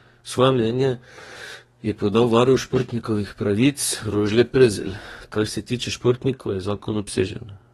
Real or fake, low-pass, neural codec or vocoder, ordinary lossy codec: fake; 14.4 kHz; codec, 32 kHz, 1.9 kbps, SNAC; AAC, 32 kbps